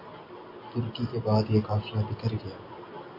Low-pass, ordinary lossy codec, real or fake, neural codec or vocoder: 5.4 kHz; AAC, 32 kbps; real; none